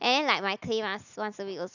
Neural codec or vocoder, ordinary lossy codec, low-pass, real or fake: none; none; 7.2 kHz; real